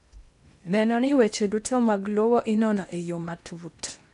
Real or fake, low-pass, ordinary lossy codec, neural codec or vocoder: fake; 10.8 kHz; none; codec, 16 kHz in and 24 kHz out, 0.8 kbps, FocalCodec, streaming, 65536 codes